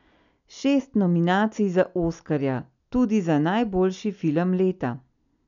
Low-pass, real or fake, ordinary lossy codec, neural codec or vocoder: 7.2 kHz; real; none; none